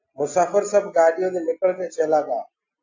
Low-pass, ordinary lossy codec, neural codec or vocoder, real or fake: 7.2 kHz; AAC, 48 kbps; none; real